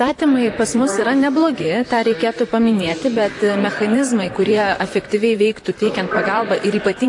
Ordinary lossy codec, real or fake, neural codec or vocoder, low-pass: AAC, 48 kbps; fake; vocoder, 44.1 kHz, 128 mel bands, Pupu-Vocoder; 10.8 kHz